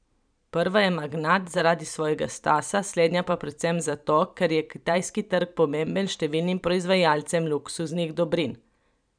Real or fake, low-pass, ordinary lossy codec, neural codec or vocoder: fake; 9.9 kHz; none; vocoder, 44.1 kHz, 128 mel bands every 512 samples, BigVGAN v2